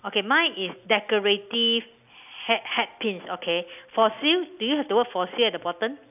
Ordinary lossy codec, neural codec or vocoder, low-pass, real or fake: none; none; 3.6 kHz; real